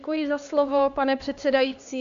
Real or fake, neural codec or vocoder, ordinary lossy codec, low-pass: fake; codec, 16 kHz, 2 kbps, X-Codec, HuBERT features, trained on LibriSpeech; AAC, 64 kbps; 7.2 kHz